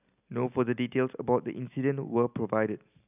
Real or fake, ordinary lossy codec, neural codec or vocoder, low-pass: real; none; none; 3.6 kHz